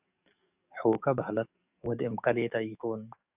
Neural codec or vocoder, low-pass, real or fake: codec, 44.1 kHz, 7.8 kbps, DAC; 3.6 kHz; fake